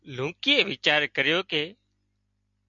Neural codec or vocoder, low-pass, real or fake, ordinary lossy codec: none; 7.2 kHz; real; MP3, 64 kbps